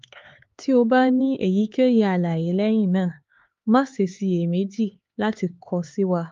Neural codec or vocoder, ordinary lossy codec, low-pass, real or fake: codec, 16 kHz, 4 kbps, X-Codec, HuBERT features, trained on LibriSpeech; Opus, 32 kbps; 7.2 kHz; fake